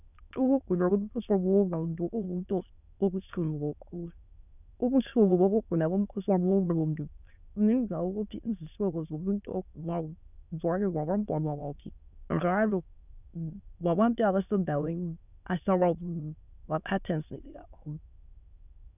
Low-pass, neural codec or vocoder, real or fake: 3.6 kHz; autoencoder, 22.05 kHz, a latent of 192 numbers a frame, VITS, trained on many speakers; fake